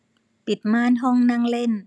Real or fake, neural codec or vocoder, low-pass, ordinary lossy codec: real; none; none; none